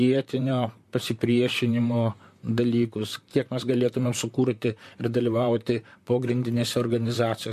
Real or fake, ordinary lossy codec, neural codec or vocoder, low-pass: fake; MP3, 64 kbps; vocoder, 44.1 kHz, 128 mel bands, Pupu-Vocoder; 14.4 kHz